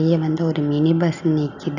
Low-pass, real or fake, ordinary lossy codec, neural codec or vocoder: 7.2 kHz; real; none; none